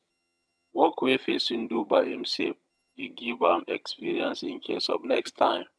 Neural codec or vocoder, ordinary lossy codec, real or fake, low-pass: vocoder, 22.05 kHz, 80 mel bands, HiFi-GAN; none; fake; none